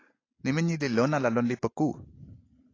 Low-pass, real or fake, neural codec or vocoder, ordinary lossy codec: 7.2 kHz; real; none; AAC, 32 kbps